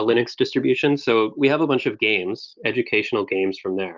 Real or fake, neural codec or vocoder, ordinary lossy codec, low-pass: real; none; Opus, 24 kbps; 7.2 kHz